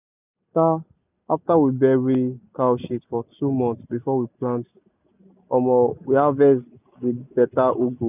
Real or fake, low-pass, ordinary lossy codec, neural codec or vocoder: real; 3.6 kHz; none; none